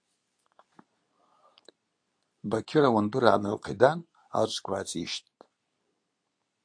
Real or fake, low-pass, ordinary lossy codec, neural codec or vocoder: fake; 9.9 kHz; AAC, 64 kbps; codec, 24 kHz, 0.9 kbps, WavTokenizer, medium speech release version 2